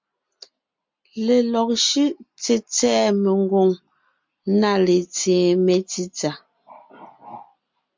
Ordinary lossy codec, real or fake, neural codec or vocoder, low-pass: MP3, 64 kbps; real; none; 7.2 kHz